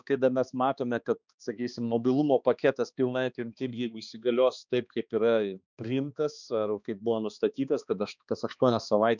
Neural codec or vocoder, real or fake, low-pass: codec, 16 kHz, 2 kbps, X-Codec, HuBERT features, trained on balanced general audio; fake; 7.2 kHz